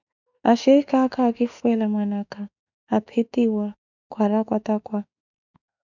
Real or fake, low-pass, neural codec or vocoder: fake; 7.2 kHz; autoencoder, 48 kHz, 32 numbers a frame, DAC-VAE, trained on Japanese speech